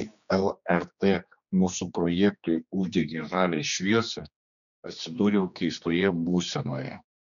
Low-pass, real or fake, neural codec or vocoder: 7.2 kHz; fake; codec, 16 kHz, 2 kbps, X-Codec, HuBERT features, trained on general audio